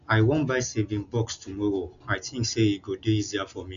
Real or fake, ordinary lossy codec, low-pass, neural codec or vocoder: real; MP3, 96 kbps; 7.2 kHz; none